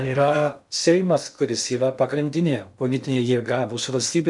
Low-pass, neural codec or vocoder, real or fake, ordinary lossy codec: 10.8 kHz; codec, 16 kHz in and 24 kHz out, 0.6 kbps, FocalCodec, streaming, 2048 codes; fake; MP3, 96 kbps